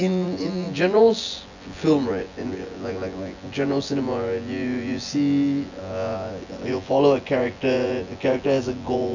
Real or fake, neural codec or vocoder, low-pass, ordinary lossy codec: fake; vocoder, 24 kHz, 100 mel bands, Vocos; 7.2 kHz; none